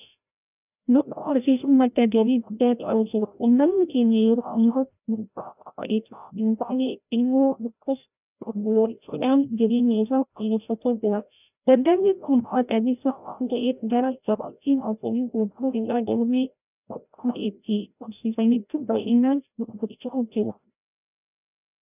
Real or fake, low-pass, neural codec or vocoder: fake; 3.6 kHz; codec, 16 kHz, 0.5 kbps, FreqCodec, larger model